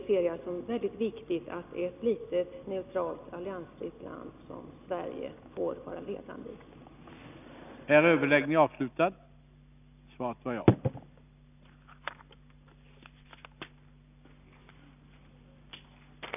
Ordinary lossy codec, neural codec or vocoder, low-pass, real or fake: none; none; 3.6 kHz; real